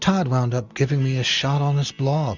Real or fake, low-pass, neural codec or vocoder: real; 7.2 kHz; none